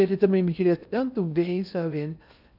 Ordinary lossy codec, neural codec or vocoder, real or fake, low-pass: none; codec, 16 kHz in and 24 kHz out, 0.6 kbps, FocalCodec, streaming, 2048 codes; fake; 5.4 kHz